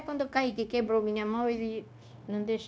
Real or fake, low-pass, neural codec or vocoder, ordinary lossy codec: fake; none; codec, 16 kHz, 0.9 kbps, LongCat-Audio-Codec; none